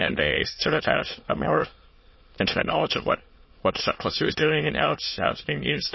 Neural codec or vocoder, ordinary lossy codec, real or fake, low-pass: autoencoder, 22.05 kHz, a latent of 192 numbers a frame, VITS, trained on many speakers; MP3, 24 kbps; fake; 7.2 kHz